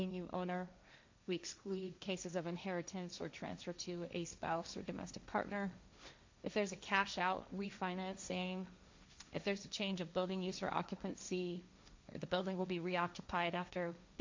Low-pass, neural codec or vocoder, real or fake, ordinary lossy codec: 7.2 kHz; codec, 16 kHz, 1.1 kbps, Voila-Tokenizer; fake; MP3, 64 kbps